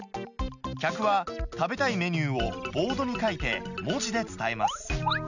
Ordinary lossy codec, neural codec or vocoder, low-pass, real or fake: none; none; 7.2 kHz; real